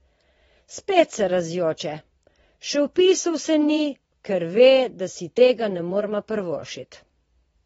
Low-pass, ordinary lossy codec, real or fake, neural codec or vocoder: 9.9 kHz; AAC, 24 kbps; fake; vocoder, 22.05 kHz, 80 mel bands, Vocos